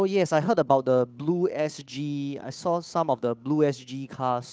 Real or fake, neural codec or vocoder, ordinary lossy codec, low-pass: fake; codec, 16 kHz, 8 kbps, FunCodec, trained on Chinese and English, 25 frames a second; none; none